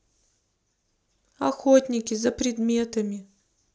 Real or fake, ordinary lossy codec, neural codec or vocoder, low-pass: real; none; none; none